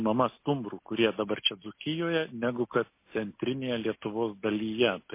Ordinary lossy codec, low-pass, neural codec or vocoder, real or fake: MP3, 24 kbps; 3.6 kHz; none; real